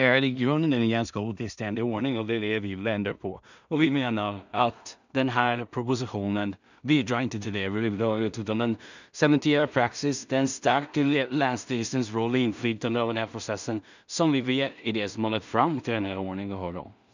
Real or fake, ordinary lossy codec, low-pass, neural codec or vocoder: fake; none; 7.2 kHz; codec, 16 kHz in and 24 kHz out, 0.4 kbps, LongCat-Audio-Codec, two codebook decoder